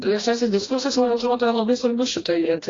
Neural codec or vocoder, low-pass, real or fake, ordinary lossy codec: codec, 16 kHz, 1 kbps, FreqCodec, smaller model; 7.2 kHz; fake; AAC, 32 kbps